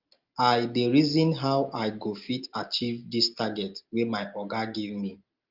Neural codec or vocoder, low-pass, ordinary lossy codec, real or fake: none; 5.4 kHz; Opus, 32 kbps; real